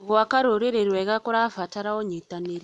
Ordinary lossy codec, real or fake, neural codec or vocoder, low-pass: none; real; none; none